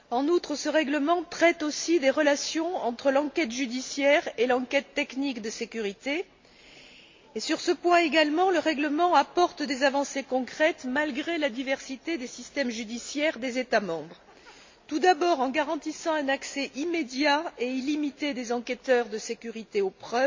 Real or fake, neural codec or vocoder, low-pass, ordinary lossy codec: real; none; 7.2 kHz; MP3, 48 kbps